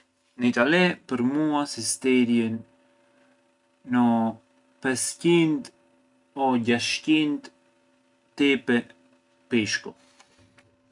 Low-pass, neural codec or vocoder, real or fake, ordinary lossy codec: 10.8 kHz; none; real; none